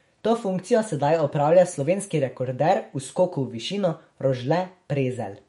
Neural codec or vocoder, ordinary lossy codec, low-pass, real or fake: autoencoder, 48 kHz, 128 numbers a frame, DAC-VAE, trained on Japanese speech; MP3, 48 kbps; 19.8 kHz; fake